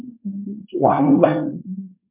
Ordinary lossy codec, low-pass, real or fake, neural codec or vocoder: MP3, 32 kbps; 3.6 kHz; fake; codec, 24 kHz, 1 kbps, SNAC